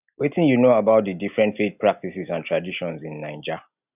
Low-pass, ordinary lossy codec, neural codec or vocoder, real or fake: 3.6 kHz; none; none; real